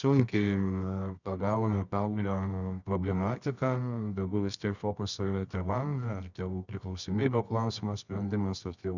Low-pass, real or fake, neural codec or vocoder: 7.2 kHz; fake; codec, 24 kHz, 0.9 kbps, WavTokenizer, medium music audio release